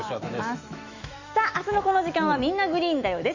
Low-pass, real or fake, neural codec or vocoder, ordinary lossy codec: 7.2 kHz; fake; autoencoder, 48 kHz, 128 numbers a frame, DAC-VAE, trained on Japanese speech; Opus, 64 kbps